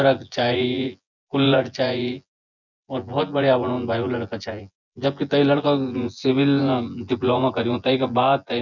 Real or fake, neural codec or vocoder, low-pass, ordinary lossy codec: fake; vocoder, 24 kHz, 100 mel bands, Vocos; 7.2 kHz; none